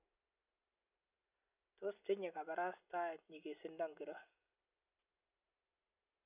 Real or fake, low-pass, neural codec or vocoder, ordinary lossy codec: real; 3.6 kHz; none; none